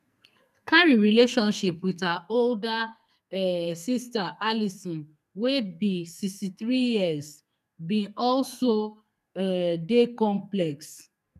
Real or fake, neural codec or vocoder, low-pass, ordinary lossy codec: fake; codec, 44.1 kHz, 2.6 kbps, SNAC; 14.4 kHz; none